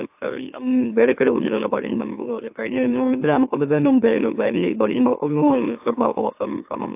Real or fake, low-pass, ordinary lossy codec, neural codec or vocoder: fake; 3.6 kHz; AAC, 32 kbps; autoencoder, 44.1 kHz, a latent of 192 numbers a frame, MeloTTS